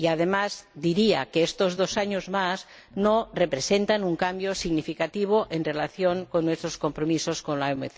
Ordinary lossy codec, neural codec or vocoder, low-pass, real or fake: none; none; none; real